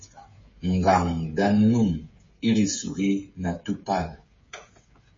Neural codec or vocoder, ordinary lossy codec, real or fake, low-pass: codec, 16 kHz, 8 kbps, FreqCodec, smaller model; MP3, 32 kbps; fake; 7.2 kHz